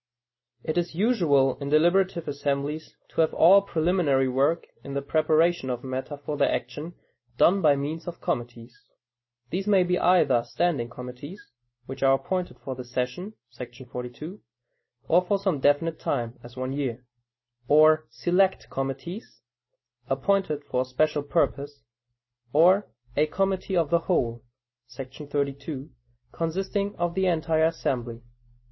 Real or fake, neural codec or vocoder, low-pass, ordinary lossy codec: real; none; 7.2 kHz; MP3, 24 kbps